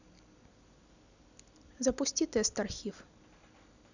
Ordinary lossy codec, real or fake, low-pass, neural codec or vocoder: none; real; 7.2 kHz; none